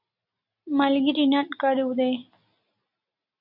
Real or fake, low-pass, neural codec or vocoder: real; 5.4 kHz; none